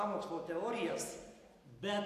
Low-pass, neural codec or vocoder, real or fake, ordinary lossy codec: 14.4 kHz; none; real; AAC, 64 kbps